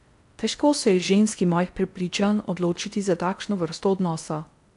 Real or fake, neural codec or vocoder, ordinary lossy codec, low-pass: fake; codec, 16 kHz in and 24 kHz out, 0.6 kbps, FocalCodec, streaming, 4096 codes; MP3, 96 kbps; 10.8 kHz